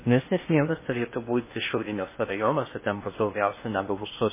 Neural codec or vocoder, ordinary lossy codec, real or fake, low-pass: codec, 16 kHz in and 24 kHz out, 0.6 kbps, FocalCodec, streaming, 2048 codes; MP3, 16 kbps; fake; 3.6 kHz